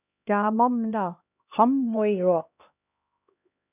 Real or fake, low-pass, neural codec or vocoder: fake; 3.6 kHz; codec, 16 kHz, 1 kbps, X-Codec, HuBERT features, trained on LibriSpeech